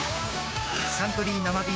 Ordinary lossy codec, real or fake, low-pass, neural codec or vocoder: none; fake; none; codec, 16 kHz, 6 kbps, DAC